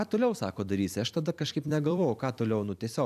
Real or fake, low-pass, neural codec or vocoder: fake; 14.4 kHz; vocoder, 44.1 kHz, 128 mel bands every 256 samples, BigVGAN v2